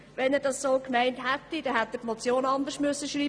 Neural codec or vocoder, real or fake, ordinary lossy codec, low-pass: none; real; none; none